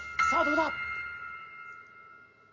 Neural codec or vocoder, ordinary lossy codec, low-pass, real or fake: none; none; 7.2 kHz; real